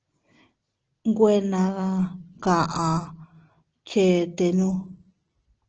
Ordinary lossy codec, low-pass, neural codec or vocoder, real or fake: Opus, 16 kbps; 7.2 kHz; none; real